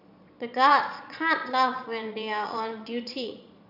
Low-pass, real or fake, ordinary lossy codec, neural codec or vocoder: 5.4 kHz; fake; none; vocoder, 22.05 kHz, 80 mel bands, Vocos